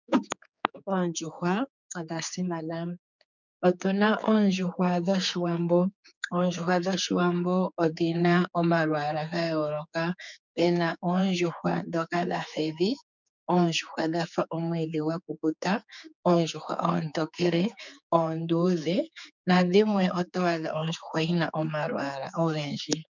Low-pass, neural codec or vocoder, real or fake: 7.2 kHz; codec, 16 kHz, 4 kbps, X-Codec, HuBERT features, trained on general audio; fake